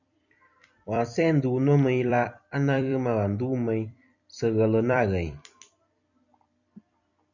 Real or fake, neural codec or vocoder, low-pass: fake; vocoder, 44.1 kHz, 128 mel bands every 256 samples, BigVGAN v2; 7.2 kHz